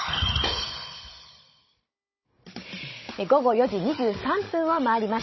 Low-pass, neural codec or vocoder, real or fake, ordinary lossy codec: 7.2 kHz; codec, 16 kHz, 16 kbps, FunCodec, trained on Chinese and English, 50 frames a second; fake; MP3, 24 kbps